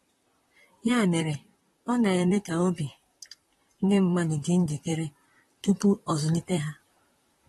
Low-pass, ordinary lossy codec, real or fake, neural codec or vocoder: 19.8 kHz; AAC, 32 kbps; fake; vocoder, 44.1 kHz, 128 mel bands, Pupu-Vocoder